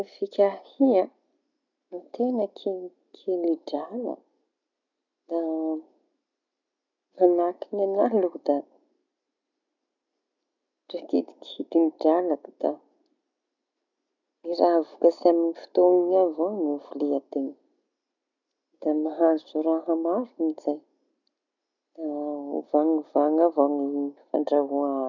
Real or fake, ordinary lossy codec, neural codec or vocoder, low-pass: real; none; none; 7.2 kHz